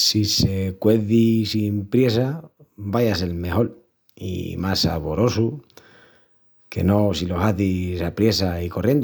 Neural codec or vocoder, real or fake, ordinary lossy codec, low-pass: none; real; none; none